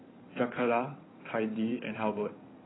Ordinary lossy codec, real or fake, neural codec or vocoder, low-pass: AAC, 16 kbps; fake; codec, 16 kHz in and 24 kHz out, 2.2 kbps, FireRedTTS-2 codec; 7.2 kHz